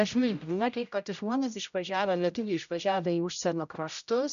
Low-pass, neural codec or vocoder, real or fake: 7.2 kHz; codec, 16 kHz, 0.5 kbps, X-Codec, HuBERT features, trained on general audio; fake